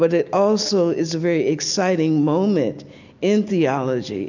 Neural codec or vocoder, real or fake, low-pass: none; real; 7.2 kHz